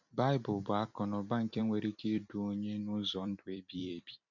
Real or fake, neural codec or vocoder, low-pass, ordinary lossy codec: fake; vocoder, 44.1 kHz, 128 mel bands every 256 samples, BigVGAN v2; 7.2 kHz; none